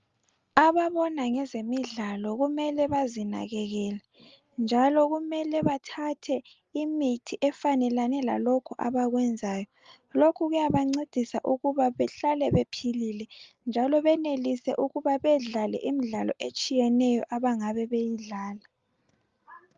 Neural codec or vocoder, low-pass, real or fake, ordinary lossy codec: none; 7.2 kHz; real; Opus, 32 kbps